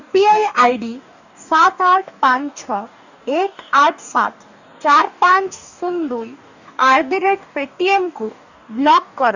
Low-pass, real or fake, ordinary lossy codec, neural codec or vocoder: 7.2 kHz; fake; none; codec, 44.1 kHz, 2.6 kbps, DAC